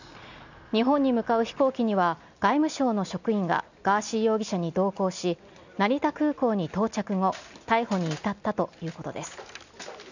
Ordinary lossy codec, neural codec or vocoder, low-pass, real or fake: none; none; 7.2 kHz; real